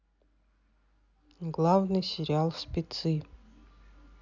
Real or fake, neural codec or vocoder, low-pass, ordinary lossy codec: real; none; 7.2 kHz; none